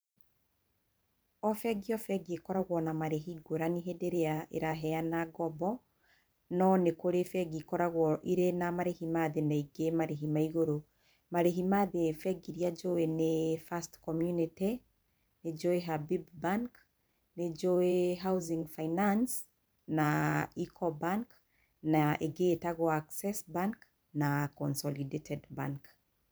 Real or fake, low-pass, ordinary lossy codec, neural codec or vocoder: fake; none; none; vocoder, 44.1 kHz, 128 mel bands every 512 samples, BigVGAN v2